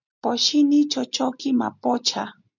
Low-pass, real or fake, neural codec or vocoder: 7.2 kHz; real; none